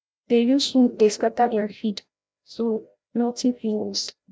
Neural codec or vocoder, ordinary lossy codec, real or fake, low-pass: codec, 16 kHz, 0.5 kbps, FreqCodec, larger model; none; fake; none